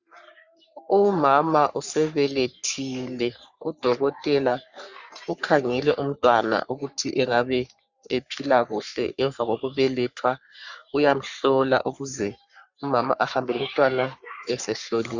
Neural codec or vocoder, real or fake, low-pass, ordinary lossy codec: codec, 44.1 kHz, 3.4 kbps, Pupu-Codec; fake; 7.2 kHz; Opus, 64 kbps